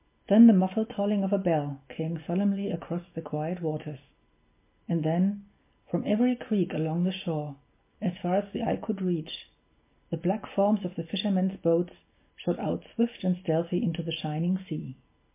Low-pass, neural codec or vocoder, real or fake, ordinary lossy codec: 3.6 kHz; none; real; MP3, 24 kbps